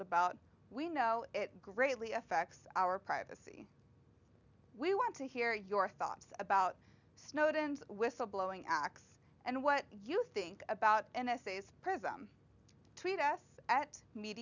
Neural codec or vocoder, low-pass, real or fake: none; 7.2 kHz; real